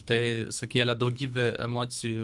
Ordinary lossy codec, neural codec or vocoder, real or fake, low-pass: MP3, 96 kbps; codec, 24 kHz, 3 kbps, HILCodec; fake; 10.8 kHz